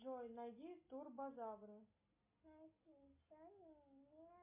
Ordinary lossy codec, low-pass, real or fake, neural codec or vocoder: MP3, 24 kbps; 3.6 kHz; real; none